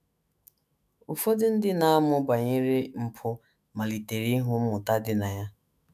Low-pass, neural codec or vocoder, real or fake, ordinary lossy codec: 14.4 kHz; autoencoder, 48 kHz, 128 numbers a frame, DAC-VAE, trained on Japanese speech; fake; none